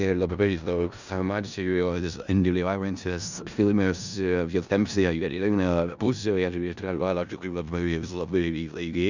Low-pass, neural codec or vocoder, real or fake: 7.2 kHz; codec, 16 kHz in and 24 kHz out, 0.4 kbps, LongCat-Audio-Codec, four codebook decoder; fake